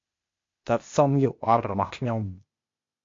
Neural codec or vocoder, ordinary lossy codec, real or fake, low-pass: codec, 16 kHz, 0.8 kbps, ZipCodec; MP3, 48 kbps; fake; 7.2 kHz